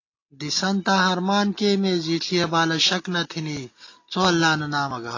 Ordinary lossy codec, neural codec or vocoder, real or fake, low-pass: AAC, 32 kbps; none; real; 7.2 kHz